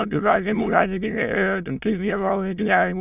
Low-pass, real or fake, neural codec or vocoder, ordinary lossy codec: 3.6 kHz; fake; autoencoder, 22.05 kHz, a latent of 192 numbers a frame, VITS, trained on many speakers; none